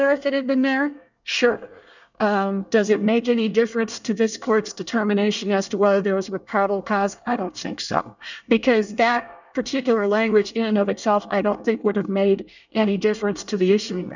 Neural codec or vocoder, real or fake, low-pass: codec, 24 kHz, 1 kbps, SNAC; fake; 7.2 kHz